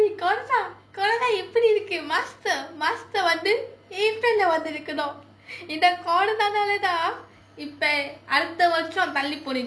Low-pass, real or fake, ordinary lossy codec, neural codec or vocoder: none; real; none; none